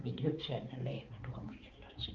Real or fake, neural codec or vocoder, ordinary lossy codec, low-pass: fake; codec, 16 kHz, 4 kbps, X-Codec, HuBERT features, trained on balanced general audio; Opus, 24 kbps; 7.2 kHz